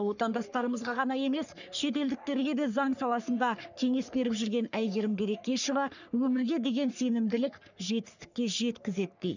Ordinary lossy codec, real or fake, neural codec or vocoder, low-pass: none; fake; codec, 44.1 kHz, 3.4 kbps, Pupu-Codec; 7.2 kHz